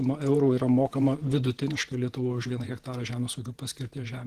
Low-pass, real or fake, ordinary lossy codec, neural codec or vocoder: 14.4 kHz; fake; Opus, 16 kbps; vocoder, 48 kHz, 128 mel bands, Vocos